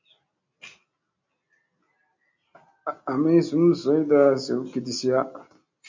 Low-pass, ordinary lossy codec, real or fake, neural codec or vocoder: 7.2 kHz; MP3, 48 kbps; real; none